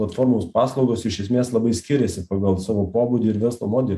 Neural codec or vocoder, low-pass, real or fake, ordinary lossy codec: none; 14.4 kHz; real; AAC, 96 kbps